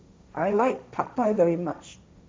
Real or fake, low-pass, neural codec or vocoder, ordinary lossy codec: fake; none; codec, 16 kHz, 1.1 kbps, Voila-Tokenizer; none